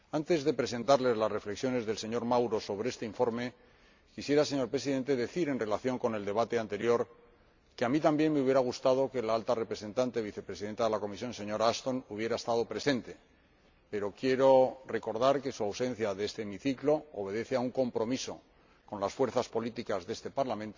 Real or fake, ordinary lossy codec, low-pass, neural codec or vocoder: real; MP3, 64 kbps; 7.2 kHz; none